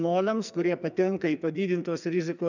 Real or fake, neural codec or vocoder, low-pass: fake; codec, 44.1 kHz, 2.6 kbps, SNAC; 7.2 kHz